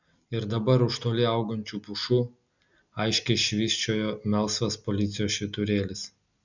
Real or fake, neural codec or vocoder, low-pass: real; none; 7.2 kHz